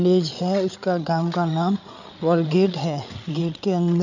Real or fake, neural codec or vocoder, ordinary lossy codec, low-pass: fake; codec, 16 kHz, 8 kbps, FreqCodec, larger model; none; 7.2 kHz